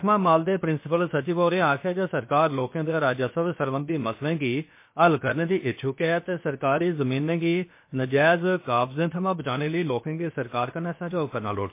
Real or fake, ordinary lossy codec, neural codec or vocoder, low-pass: fake; MP3, 24 kbps; codec, 16 kHz, about 1 kbps, DyCAST, with the encoder's durations; 3.6 kHz